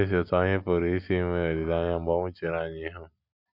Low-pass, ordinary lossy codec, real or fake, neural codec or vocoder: 5.4 kHz; MP3, 48 kbps; real; none